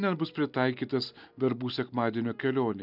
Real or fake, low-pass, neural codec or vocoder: real; 5.4 kHz; none